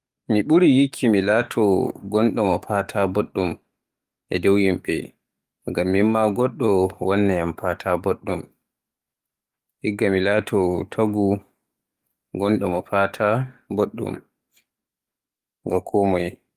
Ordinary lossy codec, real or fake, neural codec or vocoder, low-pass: Opus, 32 kbps; fake; codec, 44.1 kHz, 7.8 kbps, DAC; 14.4 kHz